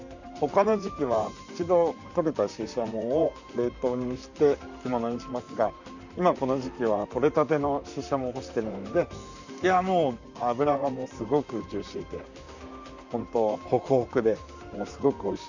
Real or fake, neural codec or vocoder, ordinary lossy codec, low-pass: fake; vocoder, 44.1 kHz, 128 mel bands, Pupu-Vocoder; none; 7.2 kHz